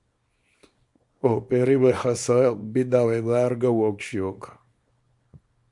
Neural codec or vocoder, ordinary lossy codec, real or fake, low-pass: codec, 24 kHz, 0.9 kbps, WavTokenizer, small release; MP3, 64 kbps; fake; 10.8 kHz